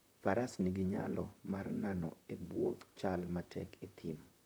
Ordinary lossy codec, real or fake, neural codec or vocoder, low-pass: none; fake; vocoder, 44.1 kHz, 128 mel bands, Pupu-Vocoder; none